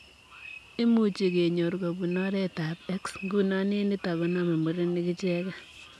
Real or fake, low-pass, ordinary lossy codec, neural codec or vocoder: real; none; none; none